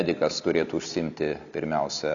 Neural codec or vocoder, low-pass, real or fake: none; 7.2 kHz; real